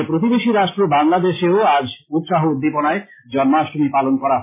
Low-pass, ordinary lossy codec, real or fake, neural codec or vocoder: 3.6 kHz; MP3, 16 kbps; real; none